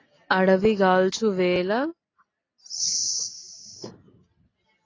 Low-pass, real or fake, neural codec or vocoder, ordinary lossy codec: 7.2 kHz; real; none; AAC, 32 kbps